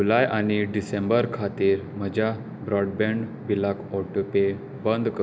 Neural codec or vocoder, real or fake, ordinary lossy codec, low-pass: none; real; none; none